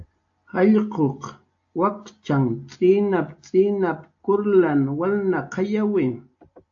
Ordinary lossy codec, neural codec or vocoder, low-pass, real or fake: AAC, 48 kbps; none; 7.2 kHz; real